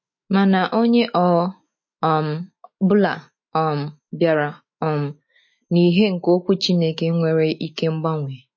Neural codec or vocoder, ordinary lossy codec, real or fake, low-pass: autoencoder, 48 kHz, 128 numbers a frame, DAC-VAE, trained on Japanese speech; MP3, 32 kbps; fake; 7.2 kHz